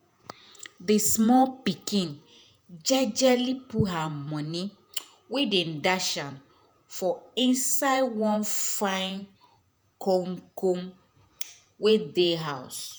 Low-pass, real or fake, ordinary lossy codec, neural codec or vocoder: none; fake; none; vocoder, 48 kHz, 128 mel bands, Vocos